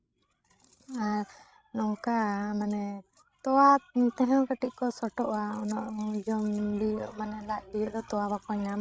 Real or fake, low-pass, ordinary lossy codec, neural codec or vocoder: fake; none; none; codec, 16 kHz, 16 kbps, FreqCodec, larger model